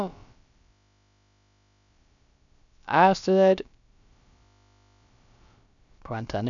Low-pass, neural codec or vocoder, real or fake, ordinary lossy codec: 7.2 kHz; codec, 16 kHz, about 1 kbps, DyCAST, with the encoder's durations; fake; MP3, 96 kbps